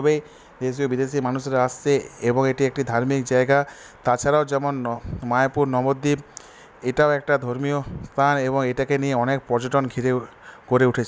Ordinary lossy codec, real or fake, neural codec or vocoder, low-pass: none; real; none; none